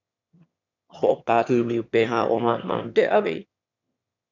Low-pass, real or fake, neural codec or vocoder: 7.2 kHz; fake; autoencoder, 22.05 kHz, a latent of 192 numbers a frame, VITS, trained on one speaker